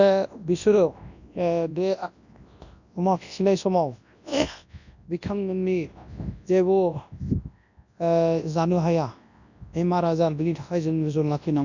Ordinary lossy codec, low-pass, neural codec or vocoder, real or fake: none; 7.2 kHz; codec, 24 kHz, 0.9 kbps, WavTokenizer, large speech release; fake